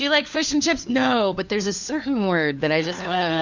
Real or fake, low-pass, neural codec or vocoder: fake; 7.2 kHz; codec, 16 kHz, 4 kbps, FunCodec, trained on LibriTTS, 50 frames a second